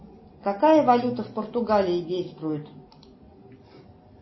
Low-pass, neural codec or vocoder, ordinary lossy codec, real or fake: 7.2 kHz; none; MP3, 24 kbps; real